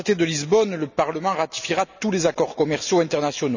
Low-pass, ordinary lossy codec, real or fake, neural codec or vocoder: 7.2 kHz; none; real; none